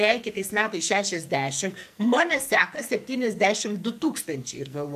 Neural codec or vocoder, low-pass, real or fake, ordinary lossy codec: codec, 44.1 kHz, 2.6 kbps, SNAC; 14.4 kHz; fake; MP3, 96 kbps